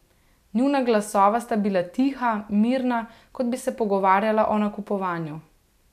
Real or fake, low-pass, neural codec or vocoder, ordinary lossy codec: real; 14.4 kHz; none; none